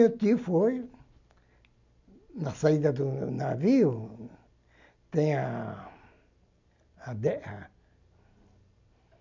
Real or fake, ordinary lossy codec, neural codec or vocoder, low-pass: real; none; none; 7.2 kHz